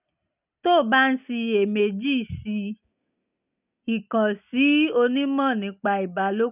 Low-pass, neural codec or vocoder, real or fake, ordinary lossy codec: 3.6 kHz; none; real; none